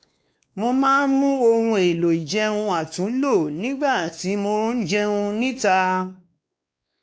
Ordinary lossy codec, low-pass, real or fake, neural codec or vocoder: none; none; fake; codec, 16 kHz, 2 kbps, X-Codec, WavLM features, trained on Multilingual LibriSpeech